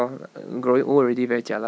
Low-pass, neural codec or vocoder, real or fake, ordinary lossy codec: none; none; real; none